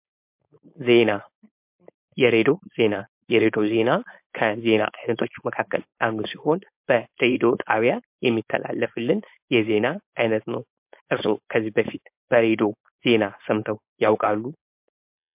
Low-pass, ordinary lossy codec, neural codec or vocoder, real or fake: 3.6 kHz; MP3, 32 kbps; codec, 16 kHz, 4.8 kbps, FACodec; fake